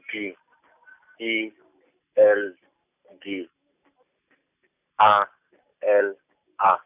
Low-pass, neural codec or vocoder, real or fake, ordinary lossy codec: 3.6 kHz; none; real; none